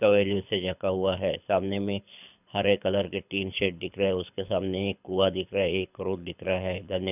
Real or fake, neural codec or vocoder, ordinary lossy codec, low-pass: fake; codec, 24 kHz, 6 kbps, HILCodec; none; 3.6 kHz